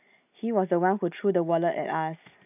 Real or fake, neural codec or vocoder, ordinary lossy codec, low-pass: real; none; none; 3.6 kHz